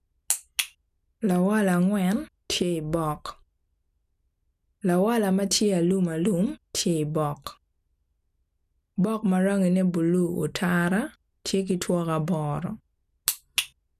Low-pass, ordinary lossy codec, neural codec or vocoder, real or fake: 14.4 kHz; none; none; real